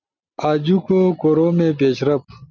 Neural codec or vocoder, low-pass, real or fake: none; 7.2 kHz; real